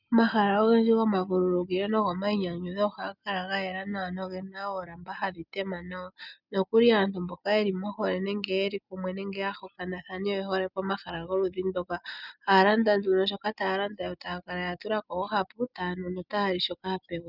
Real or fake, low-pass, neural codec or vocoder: real; 5.4 kHz; none